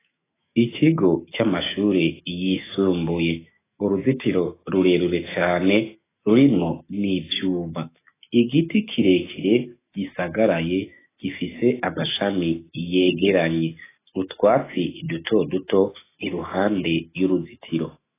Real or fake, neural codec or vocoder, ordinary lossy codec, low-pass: fake; autoencoder, 48 kHz, 128 numbers a frame, DAC-VAE, trained on Japanese speech; AAC, 16 kbps; 3.6 kHz